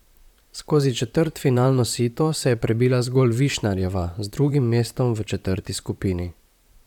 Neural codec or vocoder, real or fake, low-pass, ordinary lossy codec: vocoder, 44.1 kHz, 128 mel bands, Pupu-Vocoder; fake; 19.8 kHz; none